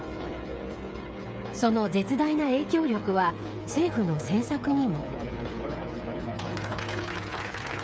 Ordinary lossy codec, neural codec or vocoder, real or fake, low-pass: none; codec, 16 kHz, 8 kbps, FreqCodec, smaller model; fake; none